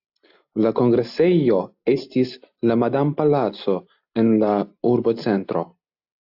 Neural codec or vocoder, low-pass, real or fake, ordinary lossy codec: none; 5.4 kHz; real; AAC, 48 kbps